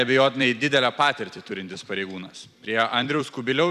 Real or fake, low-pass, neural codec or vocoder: fake; 14.4 kHz; vocoder, 44.1 kHz, 128 mel bands every 256 samples, BigVGAN v2